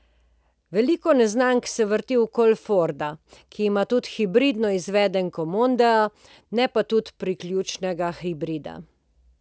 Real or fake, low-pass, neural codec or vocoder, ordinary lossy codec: real; none; none; none